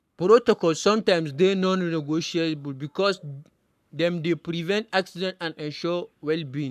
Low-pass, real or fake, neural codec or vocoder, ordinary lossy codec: 14.4 kHz; fake; codec, 44.1 kHz, 7.8 kbps, Pupu-Codec; none